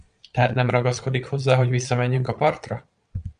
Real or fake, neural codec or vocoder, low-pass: fake; vocoder, 22.05 kHz, 80 mel bands, Vocos; 9.9 kHz